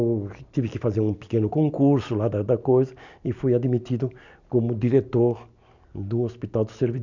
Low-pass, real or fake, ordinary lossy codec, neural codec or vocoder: 7.2 kHz; real; none; none